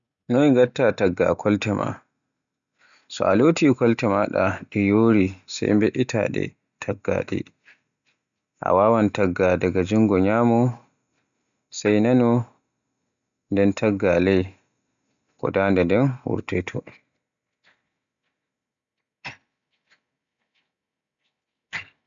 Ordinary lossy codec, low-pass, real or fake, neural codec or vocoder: none; 7.2 kHz; real; none